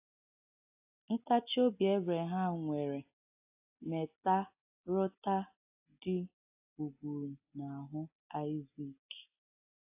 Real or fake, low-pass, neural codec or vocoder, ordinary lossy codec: real; 3.6 kHz; none; AAC, 32 kbps